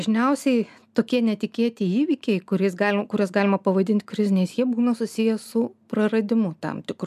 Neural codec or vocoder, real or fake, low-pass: none; real; 14.4 kHz